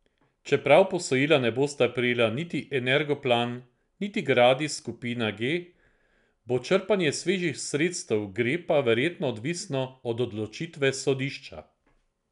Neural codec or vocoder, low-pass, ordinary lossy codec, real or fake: none; 10.8 kHz; none; real